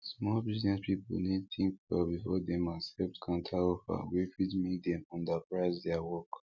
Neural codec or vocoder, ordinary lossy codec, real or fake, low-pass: none; none; real; 7.2 kHz